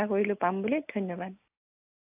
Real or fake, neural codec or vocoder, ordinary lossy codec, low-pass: real; none; AAC, 32 kbps; 3.6 kHz